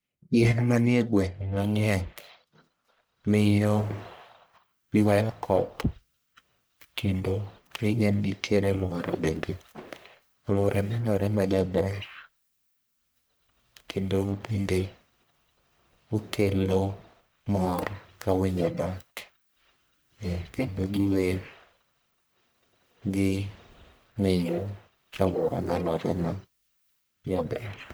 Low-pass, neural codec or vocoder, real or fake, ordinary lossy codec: none; codec, 44.1 kHz, 1.7 kbps, Pupu-Codec; fake; none